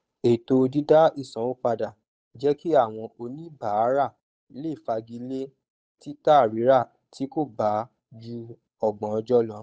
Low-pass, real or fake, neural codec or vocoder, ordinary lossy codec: none; fake; codec, 16 kHz, 8 kbps, FunCodec, trained on Chinese and English, 25 frames a second; none